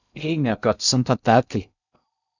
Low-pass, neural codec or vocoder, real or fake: 7.2 kHz; codec, 16 kHz in and 24 kHz out, 0.6 kbps, FocalCodec, streaming, 2048 codes; fake